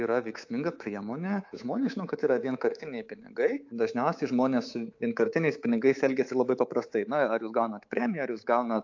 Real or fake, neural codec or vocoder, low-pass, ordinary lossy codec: fake; codec, 24 kHz, 3.1 kbps, DualCodec; 7.2 kHz; AAC, 48 kbps